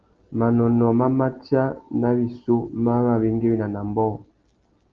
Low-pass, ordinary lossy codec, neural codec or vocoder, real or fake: 7.2 kHz; Opus, 16 kbps; none; real